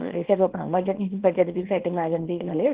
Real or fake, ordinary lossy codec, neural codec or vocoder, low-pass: fake; Opus, 16 kbps; codec, 24 kHz, 0.9 kbps, WavTokenizer, small release; 3.6 kHz